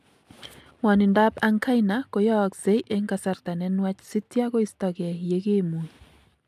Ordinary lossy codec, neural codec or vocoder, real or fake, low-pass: AAC, 96 kbps; none; real; 14.4 kHz